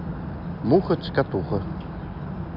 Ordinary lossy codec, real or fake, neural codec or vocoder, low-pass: none; real; none; 5.4 kHz